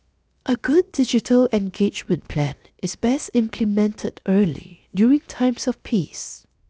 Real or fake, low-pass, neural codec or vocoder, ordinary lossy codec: fake; none; codec, 16 kHz, 0.7 kbps, FocalCodec; none